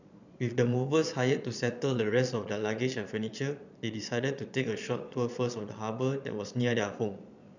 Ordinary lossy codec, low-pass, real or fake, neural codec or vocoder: none; 7.2 kHz; fake; vocoder, 22.05 kHz, 80 mel bands, Vocos